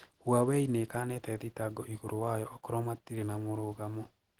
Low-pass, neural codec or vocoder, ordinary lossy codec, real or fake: 19.8 kHz; none; Opus, 16 kbps; real